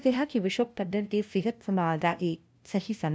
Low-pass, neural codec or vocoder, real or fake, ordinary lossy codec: none; codec, 16 kHz, 0.5 kbps, FunCodec, trained on LibriTTS, 25 frames a second; fake; none